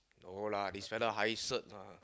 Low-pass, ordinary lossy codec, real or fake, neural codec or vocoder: none; none; real; none